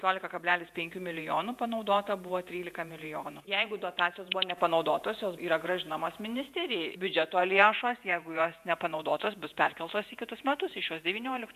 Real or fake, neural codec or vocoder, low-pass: fake; vocoder, 48 kHz, 128 mel bands, Vocos; 14.4 kHz